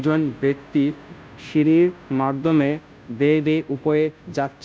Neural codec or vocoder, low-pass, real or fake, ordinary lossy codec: codec, 16 kHz, 0.5 kbps, FunCodec, trained on Chinese and English, 25 frames a second; none; fake; none